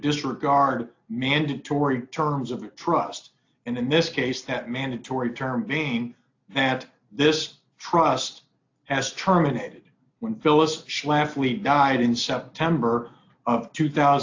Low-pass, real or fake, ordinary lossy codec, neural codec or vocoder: 7.2 kHz; real; AAC, 48 kbps; none